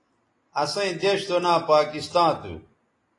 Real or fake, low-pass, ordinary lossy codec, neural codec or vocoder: real; 10.8 kHz; AAC, 32 kbps; none